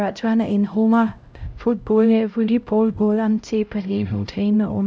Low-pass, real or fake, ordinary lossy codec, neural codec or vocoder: none; fake; none; codec, 16 kHz, 0.5 kbps, X-Codec, HuBERT features, trained on LibriSpeech